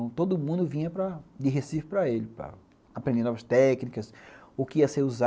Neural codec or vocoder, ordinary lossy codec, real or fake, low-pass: none; none; real; none